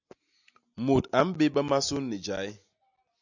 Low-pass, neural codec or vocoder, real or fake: 7.2 kHz; none; real